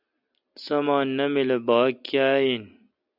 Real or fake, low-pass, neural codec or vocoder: real; 5.4 kHz; none